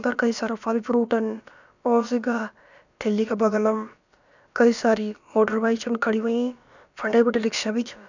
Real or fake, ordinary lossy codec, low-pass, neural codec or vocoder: fake; none; 7.2 kHz; codec, 16 kHz, about 1 kbps, DyCAST, with the encoder's durations